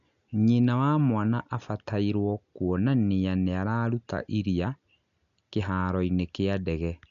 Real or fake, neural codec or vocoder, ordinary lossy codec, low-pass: real; none; none; 7.2 kHz